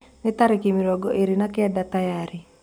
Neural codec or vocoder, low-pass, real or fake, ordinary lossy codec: none; 19.8 kHz; real; none